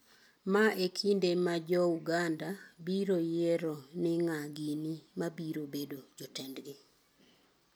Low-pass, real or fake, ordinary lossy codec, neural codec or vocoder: none; real; none; none